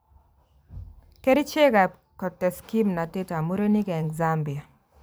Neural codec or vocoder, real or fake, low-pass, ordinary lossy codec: vocoder, 44.1 kHz, 128 mel bands every 512 samples, BigVGAN v2; fake; none; none